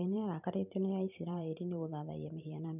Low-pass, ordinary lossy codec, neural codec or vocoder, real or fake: 3.6 kHz; none; vocoder, 44.1 kHz, 128 mel bands every 512 samples, BigVGAN v2; fake